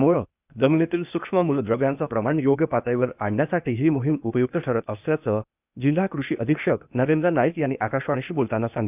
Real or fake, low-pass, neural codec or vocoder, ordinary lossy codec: fake; 3.6 kHz; codec, 16 kHz, 0.8 kbps, ZipCodec; none